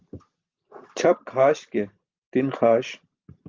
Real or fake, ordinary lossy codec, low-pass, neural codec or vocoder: real; Opus, 32 kbps; 7.2 kHz; none